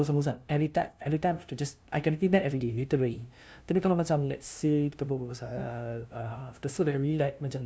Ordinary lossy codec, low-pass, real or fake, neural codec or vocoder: none; none; fake; codec, 16 kHz, 0.5 kbps, FunCodec, trained on LibriTTS, 25 frames a second